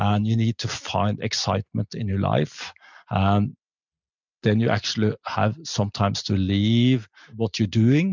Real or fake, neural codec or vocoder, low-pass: real; none; 7.2 kHz